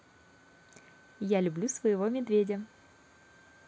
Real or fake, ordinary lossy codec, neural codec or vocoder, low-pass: real; none; none; none